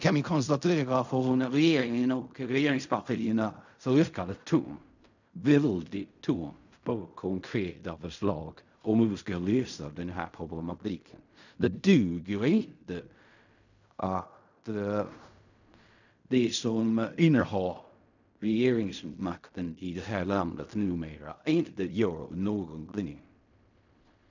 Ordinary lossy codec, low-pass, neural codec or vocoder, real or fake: none; 7.2 kHz; codec, 16 kHz in and 24 kHz out, 0.4 kbps, LongCat-Audio-Codec, fine tuned four codebook decoder; fake